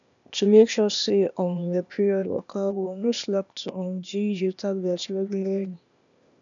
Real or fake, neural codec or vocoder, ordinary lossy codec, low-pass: fake; codec, 16 kHz, 0.8 kbps, ZipCodec; none; 7.2 kHz